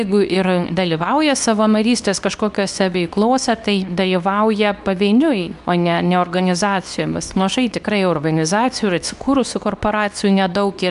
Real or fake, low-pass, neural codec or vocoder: fake; 10.8 kHz; codec, 24 kHz, 0.9 kbps, WavTokenizer, medium speech release version 2